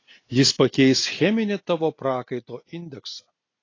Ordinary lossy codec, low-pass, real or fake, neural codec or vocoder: AAC, 32 kbps; 7.2 kHz; real; none